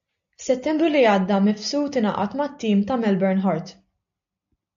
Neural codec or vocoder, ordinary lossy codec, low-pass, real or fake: none; AAC, 64 kbps; 7.2 kHz; real